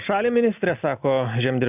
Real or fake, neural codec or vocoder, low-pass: real; none; 3.6 kHz